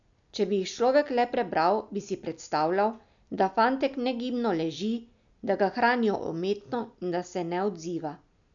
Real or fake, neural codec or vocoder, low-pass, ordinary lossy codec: real; none; 7.2 kHz; MP3, 96 kbps